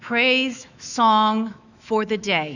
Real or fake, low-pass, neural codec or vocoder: fake; 7.2 kHz; autoencoder, 48 kHz, 128 numbers a frame, DAC-VAE, trained on Japanese speech